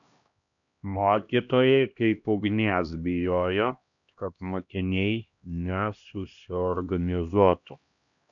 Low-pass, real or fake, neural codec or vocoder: 7.2 kHz; fake; codec, 16 kHz, 1 kbps, X-Codec, HuBERT features, trained on LibriSpeech